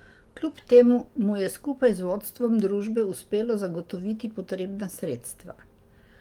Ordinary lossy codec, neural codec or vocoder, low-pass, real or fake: Opus, 32 kbps; codec, 44.1 kHz, 7.8 kbps, DAC; 19.8 kHz; fake